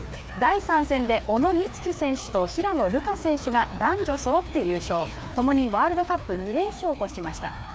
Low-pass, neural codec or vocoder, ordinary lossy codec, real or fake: none; codec, 16 kHz, 2 kbps, FreqCodec, larger model; none; fake